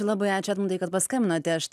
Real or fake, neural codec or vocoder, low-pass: real; none; 14.4 kHz